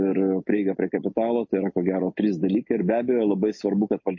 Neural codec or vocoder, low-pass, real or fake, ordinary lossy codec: none; 7.2 kHz; real; MP3, 32 kbps